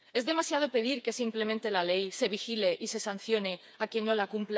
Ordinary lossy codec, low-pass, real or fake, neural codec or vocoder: none; none; fake; codec, 16 kHz, 4 kbps, FreqCodec, smaller model